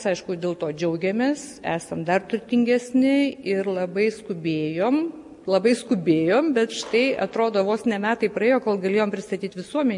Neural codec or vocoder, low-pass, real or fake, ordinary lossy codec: none; 10.8 kHz; real; MP3, 48 kbps